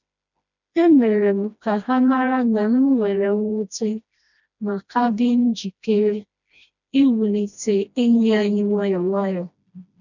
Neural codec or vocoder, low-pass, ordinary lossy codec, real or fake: codec, 16 kHz, 1 kbps, FreqCodec, smaller model; 7.2 kHz; none; fake